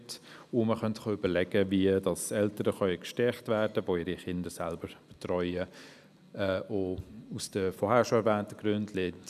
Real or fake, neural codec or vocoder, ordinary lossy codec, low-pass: real; none; none; 14.4 kHz